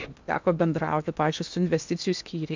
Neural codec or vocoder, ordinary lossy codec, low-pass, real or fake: codec, 16 kHz in and 24 kHz out, 0.8 kbps, FocalCodec, streaming, 65536 codes; MP3, 64 kbps; 7.2 kHz; fake